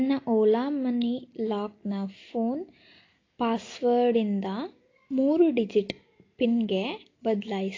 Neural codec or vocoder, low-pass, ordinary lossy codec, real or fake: none; 7.2 kHz; AAC, 32 kbps; real